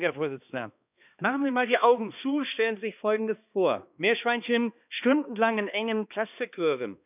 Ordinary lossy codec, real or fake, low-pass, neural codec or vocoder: none; fake; 3.6 kHz; codec, 16 kHz, 2 kbps, X-Codec, HuBERT features, trained on balanced general audio